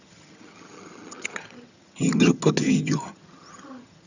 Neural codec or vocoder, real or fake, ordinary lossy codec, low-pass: vocoder, 22.05 kHz, 80 mel bands, HiFi-GAN; fake; none; 7.2 kHz